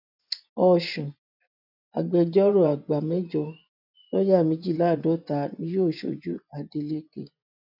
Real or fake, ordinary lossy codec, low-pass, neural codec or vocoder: fake; none; 5.4 kHz; vocoder, 24 kHz, 100 mel bands, Vocos